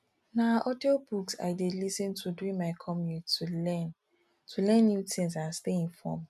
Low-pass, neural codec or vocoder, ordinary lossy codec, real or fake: 14.4 kHz; none; none; real